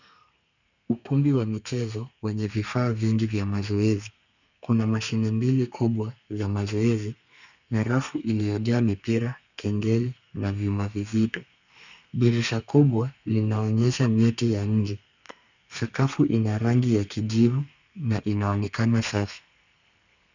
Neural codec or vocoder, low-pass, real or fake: codec, 32 kHz, 1.9 kbps, SNAC; 7.2 kHz; fake